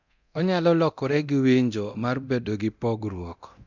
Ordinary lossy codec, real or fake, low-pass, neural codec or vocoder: none; fake; 7.2 kHz; codec, 24 kHz, 0.9 kbps, DualCodec